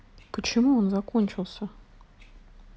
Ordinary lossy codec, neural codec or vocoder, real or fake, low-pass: none; none; real; none